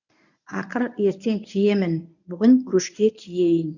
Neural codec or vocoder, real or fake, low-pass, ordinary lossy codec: codec, 24 kHz, 0.9 kbps, WavTokenizer, medium speech release version 1; fake; 7.2 kHz; none